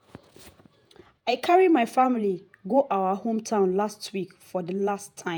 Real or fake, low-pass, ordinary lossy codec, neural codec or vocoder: fake; none; none; vocoder, 48 kHz, 128 mel bands, Vocos